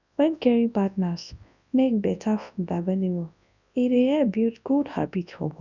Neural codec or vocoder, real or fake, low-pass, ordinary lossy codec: codec, 24 kHz, 0.9 kbps, WavTokenizer, large speech release; fake; 7.2 kHz; none